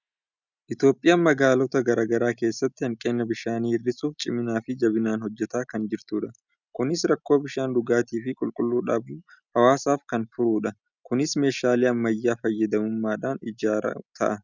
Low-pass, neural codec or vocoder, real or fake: 7.2 kHz; none; real